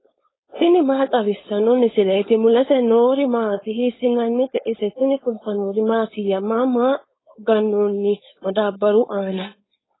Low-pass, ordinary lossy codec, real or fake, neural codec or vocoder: 7.2 kHz; AAC, 16 kbps; fake; codec, 16 kHz, 4.8 kbps, FACodec